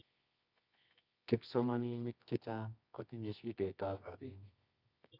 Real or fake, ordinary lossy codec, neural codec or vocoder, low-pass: fake; Opus, 64 kbps; codec, 24 kHz, 0.9 kbps, WavTokenizer, medium music audio release; 5.4 kHz